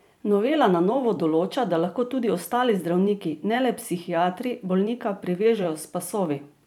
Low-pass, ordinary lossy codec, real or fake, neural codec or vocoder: 19.8 kHz; none; fake; vocoder, 44.1 kHz, 128 mel bands every 512 samples, BigVGAN v2